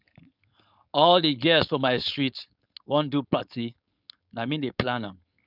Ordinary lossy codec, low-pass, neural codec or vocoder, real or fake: none; 5.4 kHz; codec, 16 kHz, 4.8 kbps, FACodec; fake